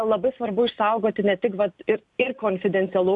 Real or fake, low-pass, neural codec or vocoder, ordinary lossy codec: real; 10.8 kHz; none; AAC, 64 kbps